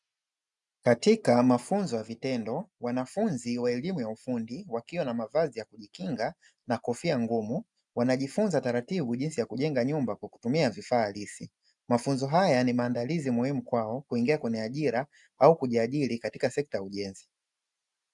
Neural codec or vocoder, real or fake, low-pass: none; real; 10.8 kHz